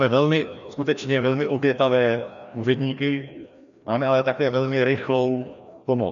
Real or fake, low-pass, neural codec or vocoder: fake; 7.2 kHz; codec, 16 kHz, 1 kbps, FreqCodec, larger model